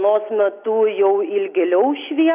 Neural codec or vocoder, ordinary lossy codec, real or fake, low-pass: none; AAC, 32 kbps; real; 3.6 kHz